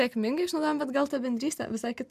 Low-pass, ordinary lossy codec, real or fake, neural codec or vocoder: 14.4 kHz; MP3, 96 kbps; real; none